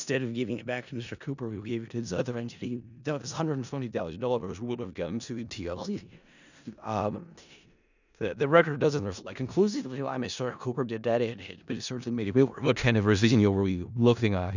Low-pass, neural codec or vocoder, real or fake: 7.2 kHz; codec, 16 kHz in and 24 kHz out, 0.4 kbps, LongCat-Audio-Codec, four codebook decoder; fake